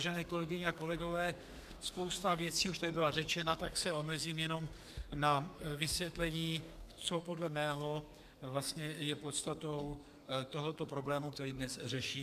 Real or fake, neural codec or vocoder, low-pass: fake; codec, 32 kHz, 1.9 kbps, SNAC; 14.4 kHz